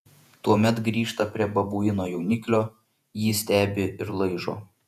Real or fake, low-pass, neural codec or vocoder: fake; 14.4 kHz; vocoder, 48 kHz, 128 mel bands, Vocos